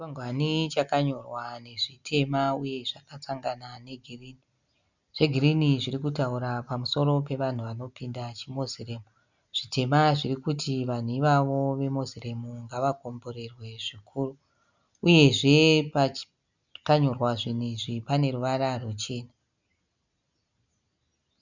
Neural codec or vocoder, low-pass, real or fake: none; 7.2 kHz; real